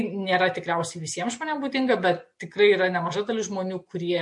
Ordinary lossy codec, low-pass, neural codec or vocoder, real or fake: MP3, 48 kbps; 10.8 kHz; none; real